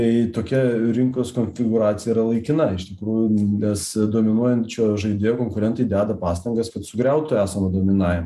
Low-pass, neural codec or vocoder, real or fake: 14.4 kHz; none; real